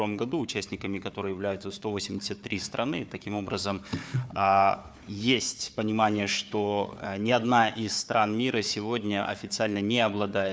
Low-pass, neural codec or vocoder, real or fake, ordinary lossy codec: none; codec, 16 kHz, 4 kbps, FunCodec, trained on Chinese and English, 50 frames a second; fake; none